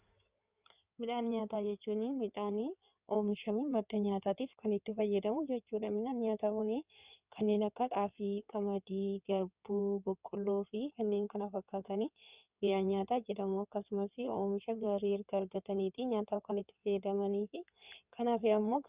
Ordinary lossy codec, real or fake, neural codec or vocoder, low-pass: Opus, 64 kbps; fake; codec, 16 kHz in and 24 kHz out, 2.2 kbps, FireRedTTS-2 codec; 3.6 kHz